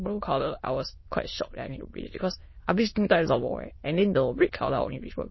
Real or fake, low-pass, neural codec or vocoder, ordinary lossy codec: fake; 7.2 kHz; autoencoder, 22.05 kHz, a latent of 192 numbers a frame, VITS, trained on many speakers; MP3, 24 kbps